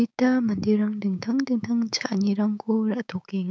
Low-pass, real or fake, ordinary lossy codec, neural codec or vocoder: none; fake; none; codec, 16 kHz, 6 kbps, DAC